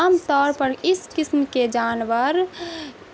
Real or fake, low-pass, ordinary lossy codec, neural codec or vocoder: real; none; none; none